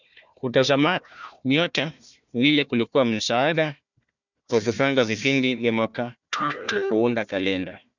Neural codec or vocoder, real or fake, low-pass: codec, 16 kHz, 1 kbps, FunCodec, trained on Chinese and English, 50 frames a second; fake; 7.2 kHz